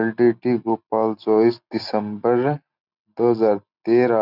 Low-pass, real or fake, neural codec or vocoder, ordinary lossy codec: 5.4 kHz; real; none; none